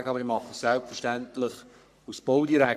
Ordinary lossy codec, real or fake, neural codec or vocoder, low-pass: none; fake; codec, 44.1 kHz, 3.4 kbps, Pupu-Codec; 14.4 kHz